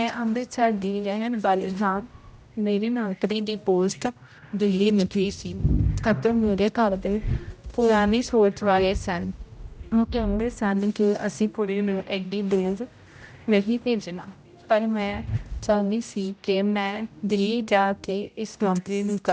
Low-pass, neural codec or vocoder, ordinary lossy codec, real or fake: none; codec, 16 kHz, 0.5 kbps, X-Codec, HuBERT features, trained on general audio; none; fake